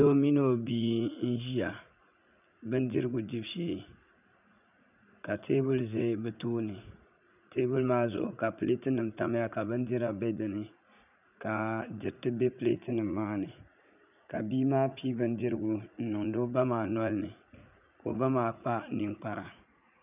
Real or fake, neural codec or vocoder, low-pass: fake; vocoder, 44.1 kHz, 80 mel bands, Vocos; 3.6 kHz